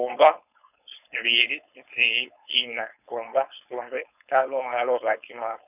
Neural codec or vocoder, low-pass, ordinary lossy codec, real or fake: codec, 16 kHz, 4.8 kbps, FACodec; 3.6 kHz; none; fake